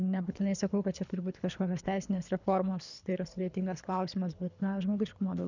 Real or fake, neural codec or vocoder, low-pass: fake; codec, 24 kHz, 3 kbps, HILCodec; 7.2 kHz